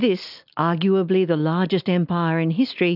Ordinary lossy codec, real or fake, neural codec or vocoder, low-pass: AAC, 48 kbps; real; none; 5.4 kHz